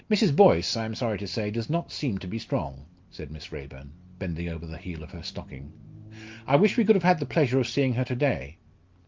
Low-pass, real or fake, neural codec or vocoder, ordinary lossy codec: 7.2 kHz; real; none; Opus, 32 kbps